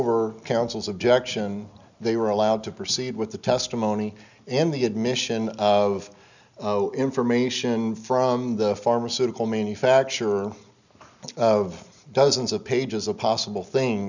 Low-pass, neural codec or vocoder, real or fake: 7.2 kHz; none; real